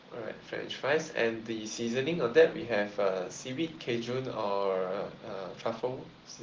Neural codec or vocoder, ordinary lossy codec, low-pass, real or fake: none; Opus, 16 kbps; 7.2 kHz; real